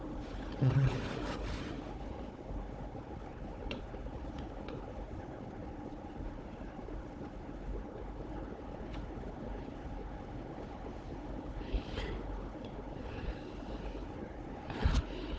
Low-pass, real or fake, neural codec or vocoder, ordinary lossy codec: none; fake; codec, 16 kHz, 4 kbps, FunCodec, trained on Chinese and English, 50 frames a second; none